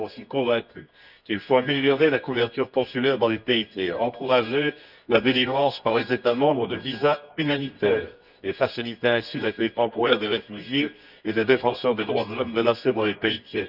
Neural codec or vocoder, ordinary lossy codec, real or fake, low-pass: codec, 24 kHz, 0.9 kbps, WavTokenizer, medium music audio release; none; fake; 5.4 kHz